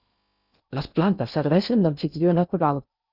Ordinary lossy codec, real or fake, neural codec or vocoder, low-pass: Opus, 64 kbps; fake; codec, 16 kHz in and 24 kHz out, 0.6 kbps, FocalCodec, streaming, 2048 codes; 5.4 kHz